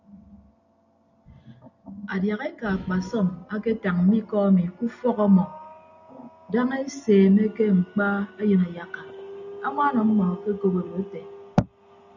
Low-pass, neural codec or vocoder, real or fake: 7.2 kHz; none; real